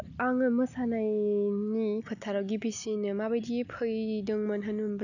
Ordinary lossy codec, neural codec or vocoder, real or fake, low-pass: none; none; real; 7.2 kHz